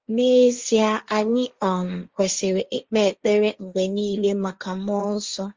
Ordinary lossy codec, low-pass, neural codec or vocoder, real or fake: Opus, 32 kbps; 7.2 kHz; codec, 16 kHz, 1.1 kbps, Voila-Tokenizer; fake